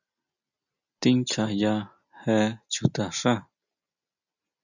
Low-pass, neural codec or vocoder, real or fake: 7.2 kHz; none; real